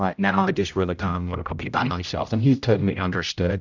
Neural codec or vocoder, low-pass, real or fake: codec, 16 kHz, 0.5 kbps, X-Codec, HuBERT features, trained on general audio; 7.2 kHz; fake